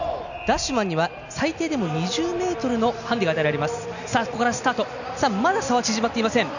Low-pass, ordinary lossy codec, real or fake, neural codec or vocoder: 7.2 kHz; none; real; none